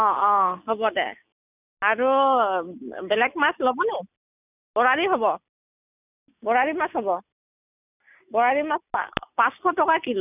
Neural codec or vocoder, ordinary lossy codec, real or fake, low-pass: none; none; real; 3.6 kHz